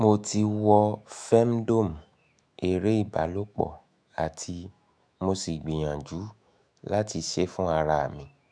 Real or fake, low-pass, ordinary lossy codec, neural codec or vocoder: fake; 9.9 kHz; none; autoencoder, 48 kHz, 128 numbers a frame, DAC-VAE, trained on Japanese speech